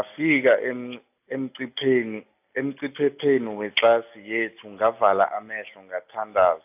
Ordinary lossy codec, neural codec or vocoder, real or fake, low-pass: AAC, 32 kbps; none; real; 3.6 kHz